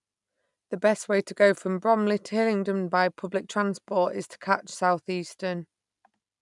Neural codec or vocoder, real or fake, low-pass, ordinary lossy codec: none; real; 10.8 kHz; none